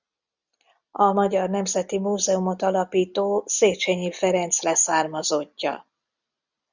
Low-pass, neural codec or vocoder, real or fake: 7.2 kHz; none; real